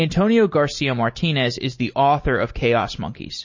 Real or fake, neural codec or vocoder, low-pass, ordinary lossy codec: real; none; 7.2 kHz; MP3, 32 kbps